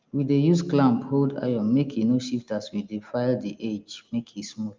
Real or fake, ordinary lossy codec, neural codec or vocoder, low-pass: real; Opus, 24 kbps; none; 7.2 kHz